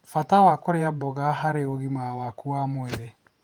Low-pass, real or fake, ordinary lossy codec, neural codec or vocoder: 19.8 kHz; fake; Opus, 32 kbps; vocoder, 48 kHz, 128 mel bands, Vocos